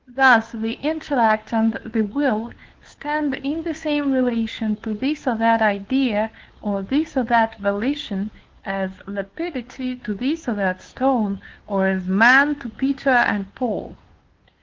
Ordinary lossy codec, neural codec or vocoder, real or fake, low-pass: Opus, 16 kbps; codec, 16 kHz, 2 kbps, FunCodec, trained on Chinese and English, 25 frames a second; fake; 7.2 kHz